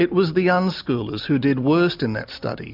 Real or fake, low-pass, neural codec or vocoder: real; 5.4 kHz; none